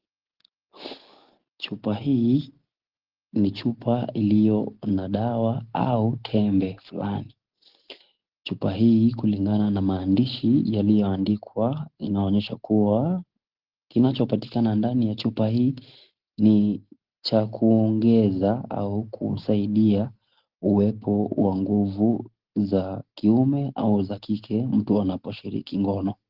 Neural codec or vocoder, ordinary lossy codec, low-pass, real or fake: none; Opus, 16 kbps; 5.4 kHz; real